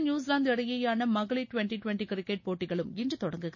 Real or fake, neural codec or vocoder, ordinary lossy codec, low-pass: real; none; MP3, 32 kbps; 7.2 kHz